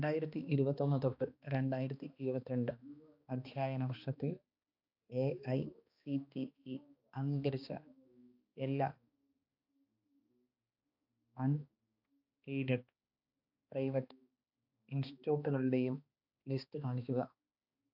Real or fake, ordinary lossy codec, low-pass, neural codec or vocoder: fake; AAC, 48 kbps; 5.4 kHz; codec, 16 kHz, 2 kbps, X-Codec, HuBERT features, trained on balanced general audio